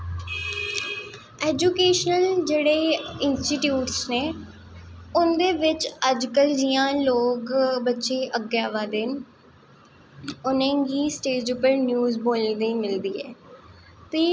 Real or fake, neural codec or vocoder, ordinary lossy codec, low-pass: real; none; none; none